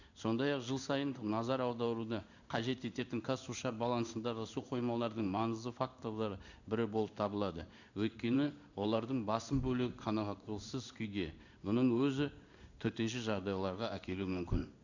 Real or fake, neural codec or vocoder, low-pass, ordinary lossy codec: fake; codec, 16 kHz in and 24 kHz out, 1 kbps, XY-Tokenizer; 7.2 kHz; none